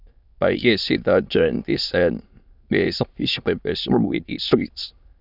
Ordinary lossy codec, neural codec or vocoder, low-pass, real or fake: none; autoencoder, 22.05 kHz, a latent of 192 numbers a frame, VITS, trained on many speakers; 5.4 kHz; fake